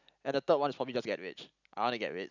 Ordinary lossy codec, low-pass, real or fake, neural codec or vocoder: none; 7.2 kHz; real; none